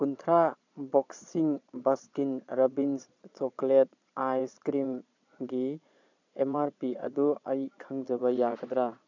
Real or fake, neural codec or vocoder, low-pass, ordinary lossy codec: fake; vocoder, 22.05 kHz, 80 mel bands, Vocos; 7.2 kHz; none